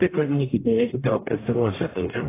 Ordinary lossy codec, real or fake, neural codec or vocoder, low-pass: AAC, 16 kbps; fake; codec, 44.1 kHz, 0.9 kbps, DAC; 3.6 kHz